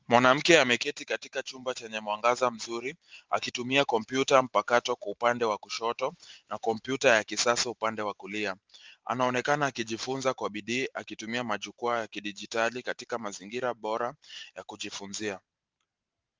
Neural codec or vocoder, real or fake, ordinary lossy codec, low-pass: vocoder, 44.1 kHz, 128 mel bands every 512 samples, BigVGAN v2; fake; Opus, 32 kbps; 7.2 kHz